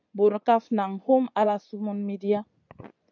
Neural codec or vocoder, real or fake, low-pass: vocoder, 24 kHz, 100 mel bands, Vocos; fake; 7.2 kHz